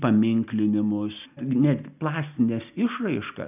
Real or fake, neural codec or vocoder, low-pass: real; none; 3.6 kHz